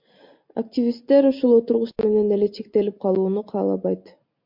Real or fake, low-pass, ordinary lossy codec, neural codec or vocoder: real; 5.4 kHz; MP3, 48 kbps; none